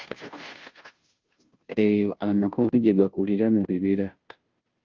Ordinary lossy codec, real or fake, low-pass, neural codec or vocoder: Opus, 24 kbps; fake; 7.2 kHz; codec, 16 kHz, 0.5 kbps, FunCodec, trained on Chinese and English, 25 frames a second